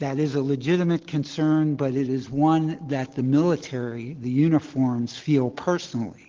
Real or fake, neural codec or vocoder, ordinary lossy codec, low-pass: real; none; Opus, 16 kbps; 7.2 kHz